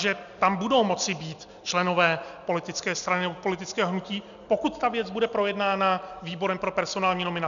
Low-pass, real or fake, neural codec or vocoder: 7.2 kHz; real; none